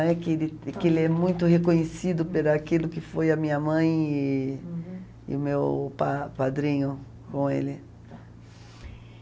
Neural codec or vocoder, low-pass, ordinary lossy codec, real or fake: none; none; none; real